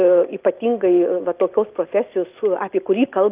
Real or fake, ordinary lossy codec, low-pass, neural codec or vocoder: real; Opus, 24 kbps; 3.6 kHz; none